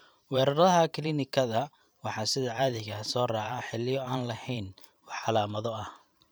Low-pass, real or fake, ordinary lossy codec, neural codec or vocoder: none; fake; none; vocoder, 44.1 kHz, 128 mel bands every 512 samples, BigVGAN v2